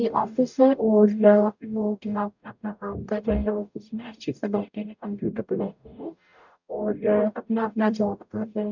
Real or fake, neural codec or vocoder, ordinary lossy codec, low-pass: fake; codec, 44.1 kHz, 0.9 kbps, DAC; none; 7.2 kHz